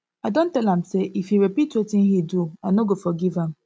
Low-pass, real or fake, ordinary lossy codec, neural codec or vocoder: none; real; none; none